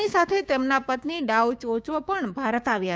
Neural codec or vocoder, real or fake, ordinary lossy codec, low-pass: codec, 16 kHz, 6 kbps, DAC; fake; none; none